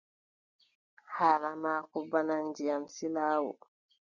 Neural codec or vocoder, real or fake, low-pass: none; real; 7.2 kHz